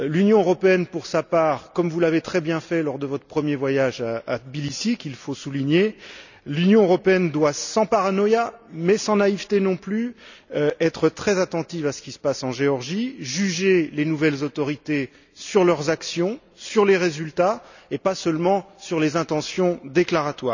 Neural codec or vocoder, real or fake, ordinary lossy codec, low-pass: none; real; none; 7.2 kHz